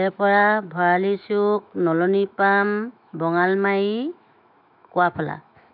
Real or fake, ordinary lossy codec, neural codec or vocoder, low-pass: fake; none; autoencoder, 48 kHz, 128 numbers a frame, DAC-VAE, trained on Japanese speech; 5.4 kHz